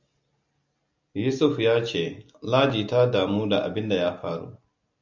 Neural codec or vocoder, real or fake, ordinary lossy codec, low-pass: none; real; MP3, 64 kbps; 7.2 kHz